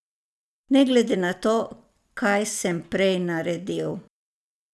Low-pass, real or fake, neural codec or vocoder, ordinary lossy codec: none; real; none; none